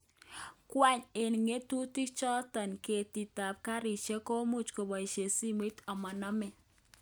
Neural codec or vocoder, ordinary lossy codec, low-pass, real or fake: none; none; none; real